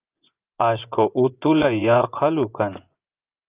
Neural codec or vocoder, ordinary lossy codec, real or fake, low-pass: vocoder, 24 kHz, 100 mel bands, Vocos; Opus, 24 kbps; fake; 3.6 kHz